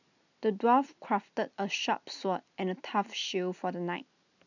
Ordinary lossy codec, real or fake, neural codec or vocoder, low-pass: none; real; none; 7.2 kHz